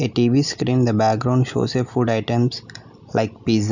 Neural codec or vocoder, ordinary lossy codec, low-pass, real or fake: none; none; 7.2 kHz; real